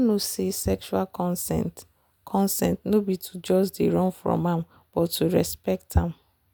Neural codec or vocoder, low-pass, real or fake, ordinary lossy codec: none; none; real; none